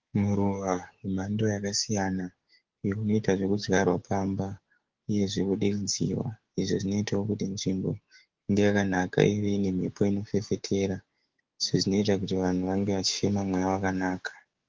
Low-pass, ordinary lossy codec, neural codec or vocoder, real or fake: 7.2 kHz; Opus, 16 kbps; codec, 16 kHz, 6 kbps, DAC; fake